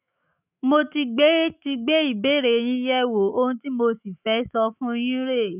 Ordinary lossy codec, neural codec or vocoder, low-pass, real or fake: none; autoencoder, 48 kHz, 128 numbers a frame, DAC-VAE, trained on Japanese speech; 3.6 kHz; fake